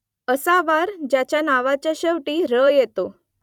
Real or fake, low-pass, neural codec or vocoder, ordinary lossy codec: fake; 19.8 kHz; vocoder, 44.1 kHz, 128 mel bands every 256 samples, BigVGAN v2; none